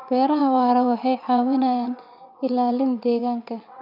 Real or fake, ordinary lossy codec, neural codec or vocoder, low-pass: fake; none; vocoder, 22.05 kHz, 80 mel bands, WaveNeXt; 5.4 kHz